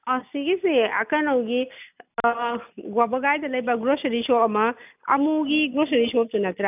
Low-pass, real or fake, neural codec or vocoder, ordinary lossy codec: 3.6 kHz; real; none; none